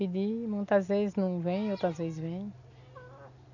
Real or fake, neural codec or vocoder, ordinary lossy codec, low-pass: real; none; none; 7.2 kHz